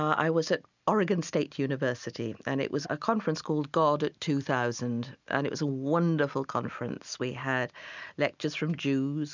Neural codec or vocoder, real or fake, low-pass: none; real; 7.2 kHz